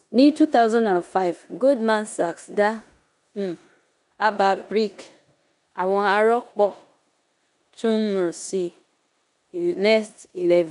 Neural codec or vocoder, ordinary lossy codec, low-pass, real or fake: codec, 16 kHz in and 24 kHz out, 0.9 kbps, LongCat-Audio-Codec, four codebook decoder; none; 10.8 kHz; fake